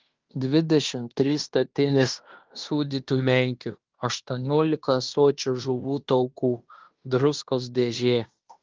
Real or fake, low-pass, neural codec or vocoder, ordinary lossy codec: fake; 7.2 kHz; codec, 16 kHz in and 24 kHz out, 0.9 kbps, LongCat-Audio-Codec, fine tuned four codebook decoder; Opus, 32 kbps